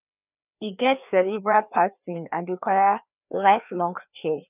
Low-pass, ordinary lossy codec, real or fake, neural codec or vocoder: 3.6 kHz; none; fake; codec, 16 kHz, 2 kbps, FreqCodec, larger model